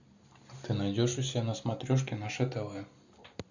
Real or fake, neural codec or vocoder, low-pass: real; none; 7.2 kHz